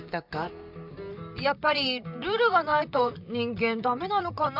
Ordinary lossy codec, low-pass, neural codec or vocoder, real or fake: none; 5.4 kHz; vocoder, 44.1 kHz, 128 mel bands, Pupu-Vocoder; fake